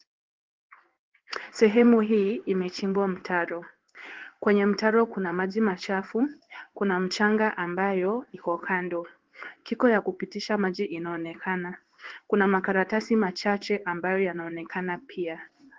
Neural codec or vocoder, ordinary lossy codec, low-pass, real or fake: codec, 16 kHz in and 24 kHz out, 1 kbps, XY-Tokenizer; Opus, 16 kbps; 7.2 kHz; fake